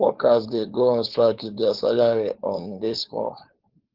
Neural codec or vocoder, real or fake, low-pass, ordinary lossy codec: codec, 16 kHz, 4.8 kbps, FACodec; fake; 5.4 kHz; Opus, 16 kbps